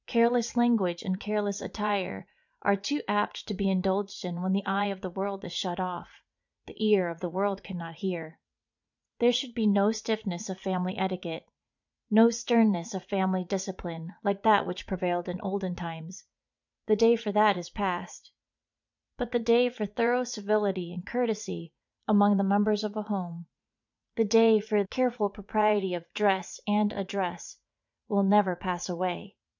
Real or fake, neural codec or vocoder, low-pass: fake; vocoder, 44.1 kHz, 80 mel bands, Vocos; 7.2 kHz